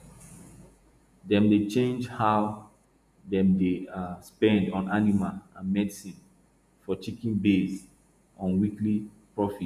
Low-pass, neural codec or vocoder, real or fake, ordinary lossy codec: 14.4 kHz; vocoder, 48 kHz, 128 mel bands, Vocos; fake; MP3, 96 kbps